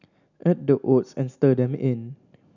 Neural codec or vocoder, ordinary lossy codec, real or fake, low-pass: none; none; real; 7.2 kHz